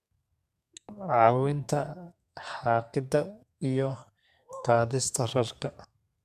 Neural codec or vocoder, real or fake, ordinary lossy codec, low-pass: codec, 32 kHz, 1.9 kbps, SNAC; fake; none; 14.4 kHz